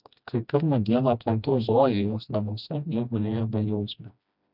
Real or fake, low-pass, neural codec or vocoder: fake; 5.4 kHz; codec, 16 kHz, 1 kbps, FreqCodec, smaller model